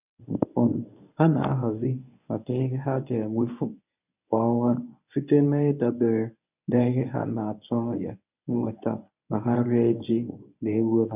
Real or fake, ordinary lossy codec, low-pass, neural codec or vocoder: fake; none; 3.6 kHz; codec, 24 kHz, 0.9 kbps, WavTokenizer, medium speech release version 1